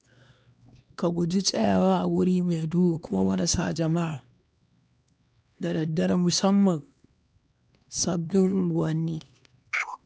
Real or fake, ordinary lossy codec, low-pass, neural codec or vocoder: fake; none; none; codec, 16 kHz, 2 kbps, X-Codec, HuBERT features, trained on LibriSpeech